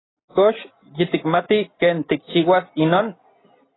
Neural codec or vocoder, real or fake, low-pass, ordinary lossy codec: none; real; 7.2 kHz; AAC, 16 kbps